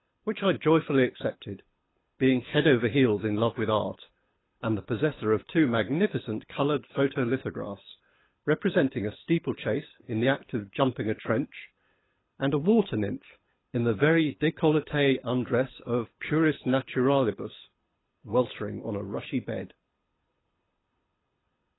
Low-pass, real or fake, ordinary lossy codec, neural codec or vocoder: 7.2 kHz; fake; AAC, 16 kbps; codec, 24 kHz, 6 kbps, HILCodec